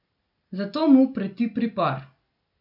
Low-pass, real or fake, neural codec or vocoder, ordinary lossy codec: 5.4 kHz; real; none; none